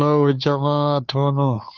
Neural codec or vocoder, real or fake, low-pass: codec, 16 kHz, 2 kbps, FunCodec, trained on Chinese and English, 25 frames a second; fake; 7.2 kHz